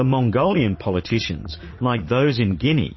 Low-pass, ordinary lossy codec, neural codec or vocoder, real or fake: 7.2 kHz; MP3, 24 kbps; vocoder, 44.1 kHz, 80 mel bands, Vocos; fake